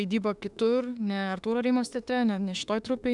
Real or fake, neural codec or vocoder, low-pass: fake; autoencoder, 48 kHz, 32 numbers a frame, DAC-VAE, trained on Japanese speech; 10.8 kHz